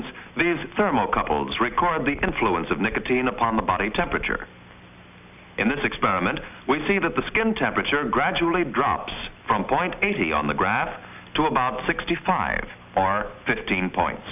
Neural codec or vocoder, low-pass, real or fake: none; 3.6 kHz; real